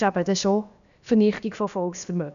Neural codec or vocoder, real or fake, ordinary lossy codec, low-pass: codec, 16 kHz, about 1 kbps, DyCAST, with the encoder's durations; fake; none; 7.2 kHz